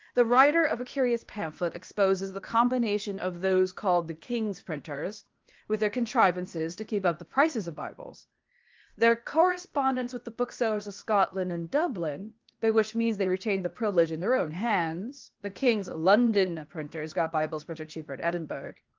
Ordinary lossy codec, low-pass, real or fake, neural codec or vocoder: Opus, 32 kbps; 7.2 kHz; fake; codec, 16 kHz, 0.8 kbps, ZipCodec